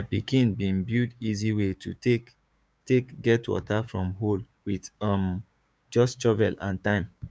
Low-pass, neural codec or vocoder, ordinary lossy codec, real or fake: none; codec, 16 kHz, 6 kbps, DAC; none; fake